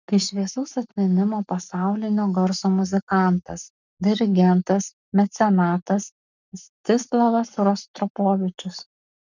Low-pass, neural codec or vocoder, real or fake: 7.2 kHz; codec, 44.1 kHz, 7.8 kbps, Pupu-Codec; fake